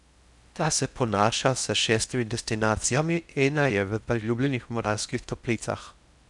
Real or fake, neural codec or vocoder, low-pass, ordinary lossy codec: fake; codec, 16 kHz in and 24 kHz out, 0.6 kbps, FocalCodec, streaming, 4096 codes; 10.8 kHz; none